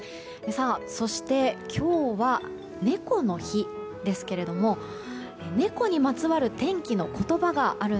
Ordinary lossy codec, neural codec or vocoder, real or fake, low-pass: none; none; real; none